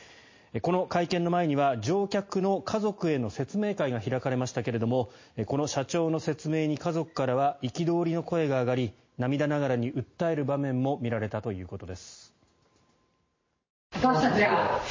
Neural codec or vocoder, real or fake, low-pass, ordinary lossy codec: none; real; 7.2 kHz; MP3, 32 kbps